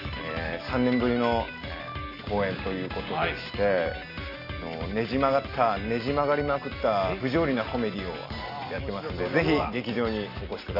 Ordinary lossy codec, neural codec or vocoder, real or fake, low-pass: AAC, 32 kbps; none; real; 5.4 kHz